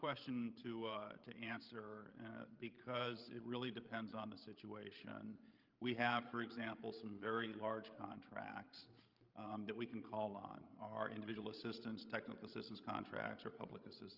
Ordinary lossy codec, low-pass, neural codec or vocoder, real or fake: Opus, 24 kbps; 5.4 kHz; codec, 16 kHz, 16 kbps, FreqCodec, larger model; fake